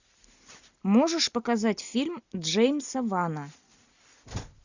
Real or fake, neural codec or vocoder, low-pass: real; none; 7.2 kHz